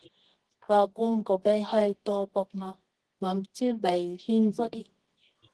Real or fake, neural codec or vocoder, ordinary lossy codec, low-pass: fake; codec, 24 kHz, 0.9 kbps, WavTokenizer, medium music audio release; Opus, 16 kbps; 10.8 kHz